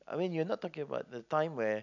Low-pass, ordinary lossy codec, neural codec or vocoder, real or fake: 7.2 kHz; none; none; real